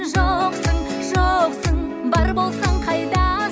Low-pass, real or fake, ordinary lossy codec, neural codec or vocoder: none; real; none; none